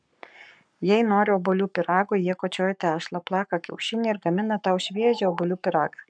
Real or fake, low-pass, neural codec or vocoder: fake; 9.9 kHz; codec, 44.1 kHz, 7.8 kbps, Pupu-Codec